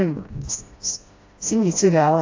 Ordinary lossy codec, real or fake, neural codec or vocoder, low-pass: AAC, 48 kbps; fake; codec, 16 kHz, 1 kbps, FreqCodec, smaller model; 7.2 kHz